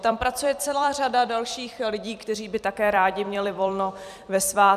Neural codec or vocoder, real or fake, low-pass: vocoder, 44.1 kHz, 128 mel bands every 256 samples, BigVGAN v2; fake; 14.4 kHz